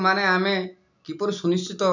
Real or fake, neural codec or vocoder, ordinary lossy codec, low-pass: real; none; none; 7.2 kHz